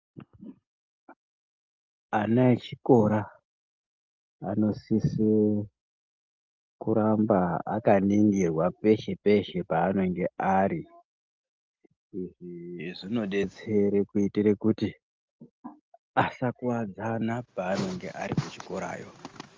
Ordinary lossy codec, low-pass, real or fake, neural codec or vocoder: Opus, 32 kbps; 7.2 kHz; real; none